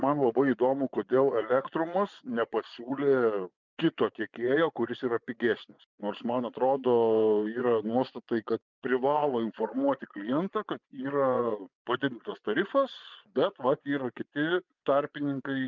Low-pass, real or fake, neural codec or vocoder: 7.2 kHz; fake; vocoder, 24 kHz, 100 mel bands, Vocos